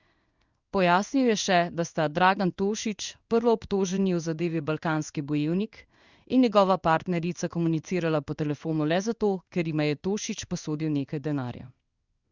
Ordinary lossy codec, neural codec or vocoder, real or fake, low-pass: Opus, 64 kbps; codec, 16 kHz in and 24 kHz out, 1 kbps, XY-Tokenizer; fake; 7.2 kHz